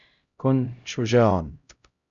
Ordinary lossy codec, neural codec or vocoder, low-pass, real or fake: Opus, 64 kbps; codec, 16 kHz, 0.5 kbps, X-Codec, HuBERT features, trained on LibriSpeech; 7.2 kHz; fake